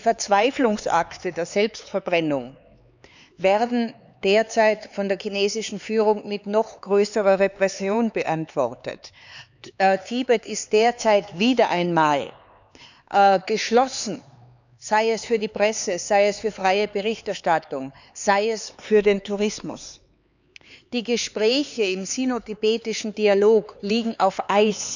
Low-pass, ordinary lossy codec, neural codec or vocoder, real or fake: 7.2 kHz; none; codec, 16 kHz, 4 kbps, X-Codec, HuBERT features, trained on LibriSpeech; fake